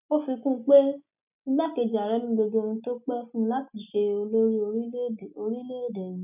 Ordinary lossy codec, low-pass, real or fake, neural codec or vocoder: none; 3.6 kHz; real; none